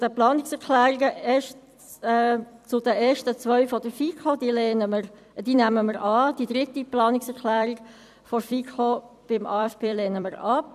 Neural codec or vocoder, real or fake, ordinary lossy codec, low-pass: vocoder, 44.1 kHz, 128 mel bands every 256 samples, BigVGAN v2; fake; none; 14.4 kHz